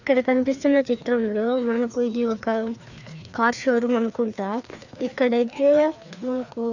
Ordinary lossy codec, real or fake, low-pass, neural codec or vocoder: none; fake; 7.2 kHz; codec, 16 kHz, 2 kbps, FreqCodec, larger model